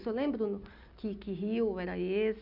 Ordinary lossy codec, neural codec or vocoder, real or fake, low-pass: none; none; real; 5.4 kHz